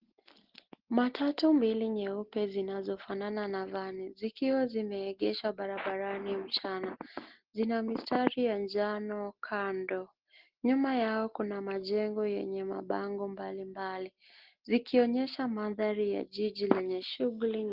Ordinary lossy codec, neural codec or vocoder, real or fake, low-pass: Opus, 16 kbps; none; real; 5.4 kHz